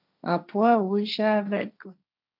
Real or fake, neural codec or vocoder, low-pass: fake; codec, 16 kHz, 1.1 kbps, Voila-Tokenizer; 5.4 kHz